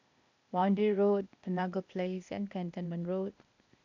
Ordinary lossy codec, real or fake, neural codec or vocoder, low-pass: none; fake; codec, 16 kHz, 0.8 kbps, ZipCodec; 7.2 kHz